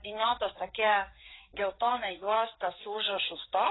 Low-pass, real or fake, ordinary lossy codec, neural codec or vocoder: 7.2 kHz; fake; AAC, 16 kbps; codec, 16 kHz, 4 kbps, FreqCodec, larger model